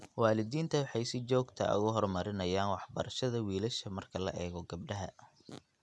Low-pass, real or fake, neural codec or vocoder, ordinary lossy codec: none; real; none; none